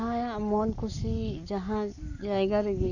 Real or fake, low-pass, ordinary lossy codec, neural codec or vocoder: fake; 7.2 kHz; none; codec, 44.1 kHz, 7.8 kbps, Pupu-Codec